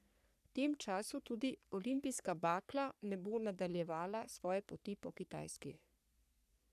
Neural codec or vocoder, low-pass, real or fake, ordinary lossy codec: codec, 44.1 kHz, 3.4 kbps, Pupu-Codec; 14.4 kHz; fake; none